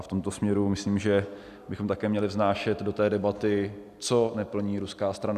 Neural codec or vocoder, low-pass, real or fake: none; 14.4 kHz; real